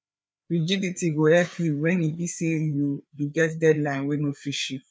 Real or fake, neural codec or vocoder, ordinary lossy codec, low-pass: fake; codec, 16 kHz, 4 kbps, FreqCodec, larger model; none; none